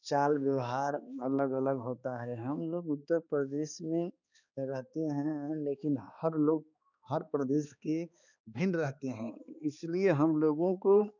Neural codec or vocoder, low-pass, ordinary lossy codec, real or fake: codec, 16 kHz, 2 kbps, X-Codec, HuBERT features, trained on balanced general audio; 7.2 kHz; none; fake